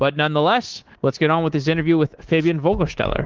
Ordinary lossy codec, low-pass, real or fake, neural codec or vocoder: Opus, 16 kbps; 7.2 kHz; fake; codec, 24 kHz, 3.1 kbps, DualCodec